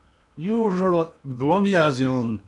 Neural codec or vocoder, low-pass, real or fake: codec, 16 kHz in and 24 kHz out, 0.8 kbps, FocalCodec, streaming, 65536 codes; 10.8 kHz; fake